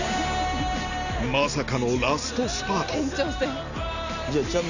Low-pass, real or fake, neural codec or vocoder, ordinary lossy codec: 7.2 kHz; real; none; none